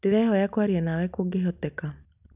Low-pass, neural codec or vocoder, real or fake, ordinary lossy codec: 3.6 kHz; none; real; none